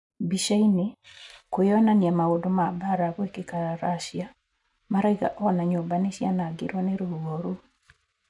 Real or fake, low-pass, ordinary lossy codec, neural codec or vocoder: real; 10.8 kHz; none; none